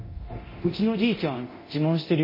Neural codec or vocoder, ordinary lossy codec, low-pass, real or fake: codec, 24 kHz, 0.9 kbps, DualCodec; MP3, 24 kbps; 5.4 kHz; fake